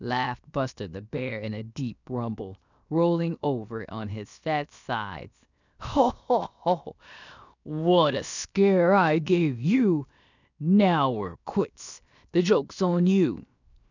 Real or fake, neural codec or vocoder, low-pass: fake; codec, 16 kHz, 0.7 kbps, FocalCodec; 7.2 kHz